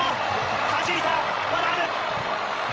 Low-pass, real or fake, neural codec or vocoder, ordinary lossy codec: none; fake; codec, 16 kHz, 16 kbps, FreqCodec, larger model; none